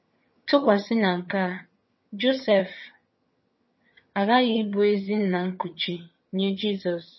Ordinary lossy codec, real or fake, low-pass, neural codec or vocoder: MP3, 24 kbps; fake; 7.2 kHz; vocoder, 22.05 kHz, 80 mel bands, HiFi-GAN